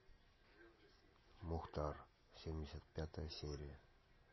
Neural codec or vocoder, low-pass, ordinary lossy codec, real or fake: none; 7.2 kHz; MP3, 24 kbps; real